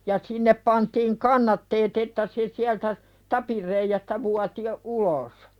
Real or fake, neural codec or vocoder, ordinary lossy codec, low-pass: real; none; Opus, 64 kbps; 19.8 kHz